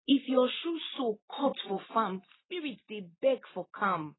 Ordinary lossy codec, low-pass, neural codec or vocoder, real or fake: AAC, 16 kbps; 7.2 kHz; none; real